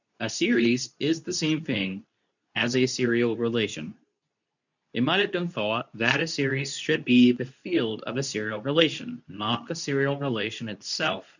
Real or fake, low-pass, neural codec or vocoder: fake; 7.2 kHz; codec, 24 kHz, 0.9 kbps, WavTokenizer, medium speech release version 2